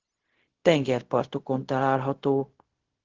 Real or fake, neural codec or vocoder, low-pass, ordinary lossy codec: fake; codec, 16 kHz, 0.4 kbps, LongCat-Audio-Codec; 7.2 kHz; Opus, 16 kbps